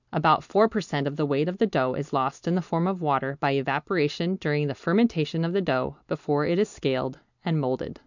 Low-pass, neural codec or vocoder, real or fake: 7.2 kHz; none; real